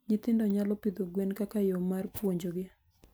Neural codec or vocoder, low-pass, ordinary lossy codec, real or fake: none; none; none; real